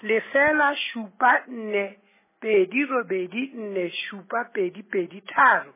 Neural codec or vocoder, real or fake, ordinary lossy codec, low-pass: none; real; MP3, 16 kbps; 3.6 kHz